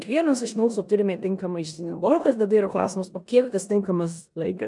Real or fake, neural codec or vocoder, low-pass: fake; codec, 16 kHz in and 24 kHz out, 0.9 kbps, LongCat-Audio-Codec, four codebook decoder; 10.8 kHz